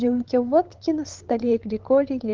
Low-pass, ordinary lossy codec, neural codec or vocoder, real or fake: 7.2 kHz; Opus, 16 kbps; codec, 16 kHz, 8 kbps, FunCodec, trained on LibriTTS, 25 frames a second; fake